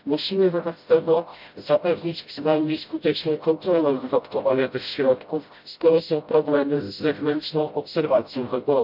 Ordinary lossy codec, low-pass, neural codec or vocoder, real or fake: MP3, 48 kbps; 5.4 kHz; codec, 16 kHz, 0.5 kbps, FreqCodec, smaller model; fake